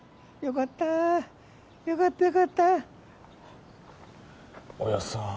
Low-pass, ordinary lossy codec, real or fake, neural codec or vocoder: none; none; real; none